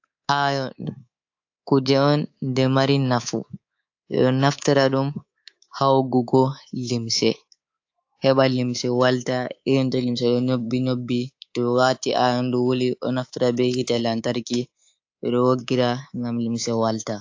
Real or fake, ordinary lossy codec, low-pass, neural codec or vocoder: fake; AAC, 48 kbps; 7.2 kHz; codec, 24 kHz, 3.1 kbps, DualCodec